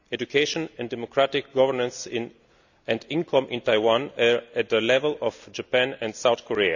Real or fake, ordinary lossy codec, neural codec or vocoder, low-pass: real; none; none; 7.2 kHz